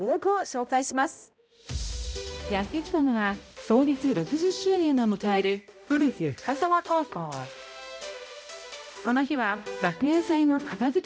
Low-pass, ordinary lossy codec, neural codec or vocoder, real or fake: none; none; codec, 16 kHz, 0.5 kbps, X-Codec, HuBERT features, trained on balanced general audio; fake